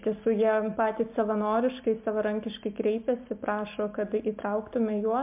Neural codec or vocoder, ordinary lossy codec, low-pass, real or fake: none; MP3, 32 kbps; 3.6 kHz; real